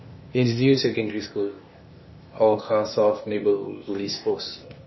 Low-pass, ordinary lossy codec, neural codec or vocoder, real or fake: 7.2 kHz; MP3, 24 kbps; codec, 16 kHz, 0.8 kbps, ZipCodec; fake